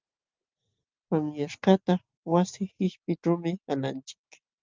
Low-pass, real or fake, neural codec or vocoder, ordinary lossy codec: 7.2 kHz; real; none; Opus, 24 kbps